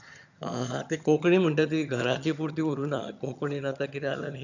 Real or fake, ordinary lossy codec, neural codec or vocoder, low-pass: fake; none; vocoder, 22.05 kHz, 80 mel bands, HiFi-GAN; 7.2 kHz